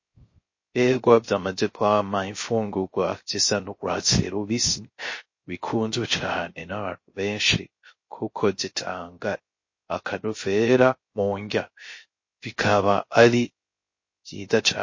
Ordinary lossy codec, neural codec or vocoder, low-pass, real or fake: MP3, 32 kbps; codec, 16 kHz, 0.3 kbps, FocalCodec; 7.2 kHz; fake